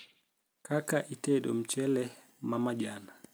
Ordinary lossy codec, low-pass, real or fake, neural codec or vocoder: none; none; real; none